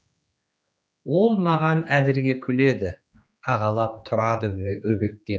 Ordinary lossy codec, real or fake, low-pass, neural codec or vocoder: none; fake; none; codec, 16 kHz, 2 kbps, X-Codec, HuBERT features, trained on general audio